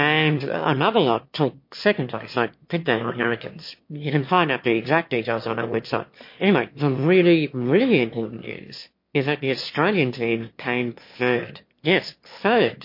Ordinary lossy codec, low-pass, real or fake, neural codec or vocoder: MP3, 32 kbps; 5.4 kHz; fake; autoencoder, 22.05 kHz, a latent of 192 numbers a frame, VITS, trained on one speaker